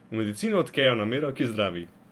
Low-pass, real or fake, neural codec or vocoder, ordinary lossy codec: 19.8 kHz; fake; vocoder, 48 kHz, 128 mel bands, Vocos; Opus, 32 kbps